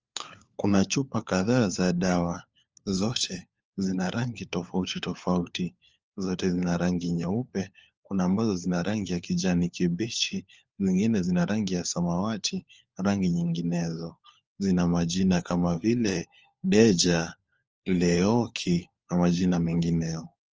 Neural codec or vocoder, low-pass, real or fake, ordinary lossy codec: codec, 16 kHz, 4 kbps, FunCodec, trained on LibriTTS, 50 frames a second; 7.2 kHz; fake; Opus, 24 kbps